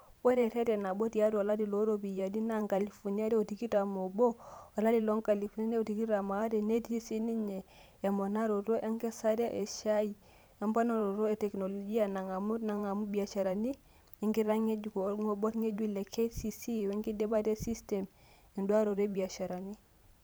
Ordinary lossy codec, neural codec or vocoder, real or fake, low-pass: none; vocoder, 44.1 kHz, 128 mel bands every 512 samples, BigVGAN v2; fake; none